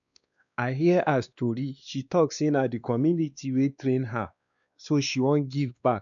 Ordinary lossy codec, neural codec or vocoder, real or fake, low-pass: none; codec, 16 kHz, 2 kbps, X-Codec, WavLM features, trained on Multilingual LibriSpeech; fake; 7.2 kHz